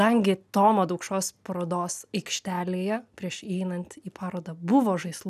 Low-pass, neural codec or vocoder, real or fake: 14.4 kHz; none; real